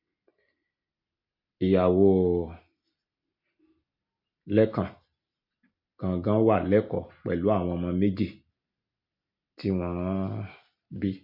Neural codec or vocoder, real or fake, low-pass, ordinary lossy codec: none; real; 5.4 kHz; MP3, 32 kbps